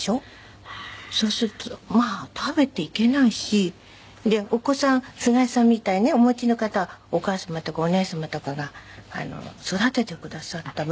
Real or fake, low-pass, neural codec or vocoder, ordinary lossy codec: real; none; none; none